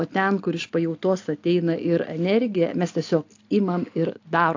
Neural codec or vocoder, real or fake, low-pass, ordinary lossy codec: none; real; 7.2 kHz; AAC, 48 kbps